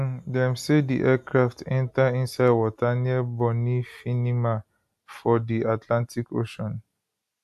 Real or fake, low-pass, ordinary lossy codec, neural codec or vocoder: real; 14.4 kHz; AAC, 96 kbps; none